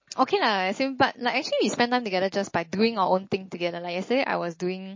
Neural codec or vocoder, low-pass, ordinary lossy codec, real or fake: none; 7.2 kHz; MP3, 32 kbps; real